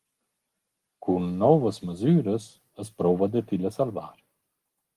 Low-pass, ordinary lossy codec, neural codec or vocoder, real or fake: 14.4 kHz; Opus, 24 kbps; none; real